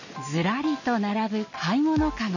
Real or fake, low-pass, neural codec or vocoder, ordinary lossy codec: real; 7.2 kHz; none; none